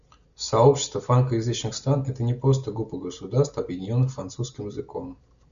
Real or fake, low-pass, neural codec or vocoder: real; 7.2 kHz; none